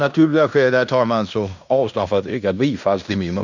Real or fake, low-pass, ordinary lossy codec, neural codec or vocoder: fake; 7.2 kHz; none; codec, 16 kHz in and 24 kHz out, 0.9 kbps, LongCat-Audio-Codec, fine tuned four codebook decoder